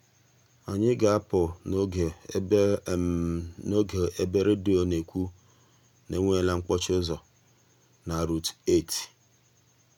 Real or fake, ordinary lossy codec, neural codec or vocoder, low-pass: real; none; none; 19.8 kHz